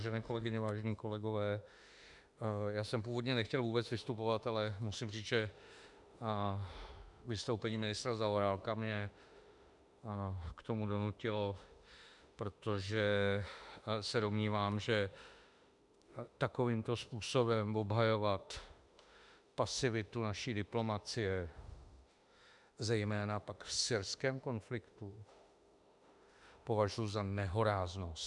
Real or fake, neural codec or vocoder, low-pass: fake; autoencoder, 48 kHz, 32 numbers a frame, DAC-VAE, trained on Japanese speech; 10.8 kHz